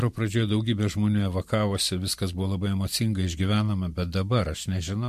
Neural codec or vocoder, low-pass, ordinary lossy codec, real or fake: vocoder, 44.1 kHz, 128 mel bands every 512 samples, BigVGAN v2; 14.4 kHz; MP3, 64 kbps; fake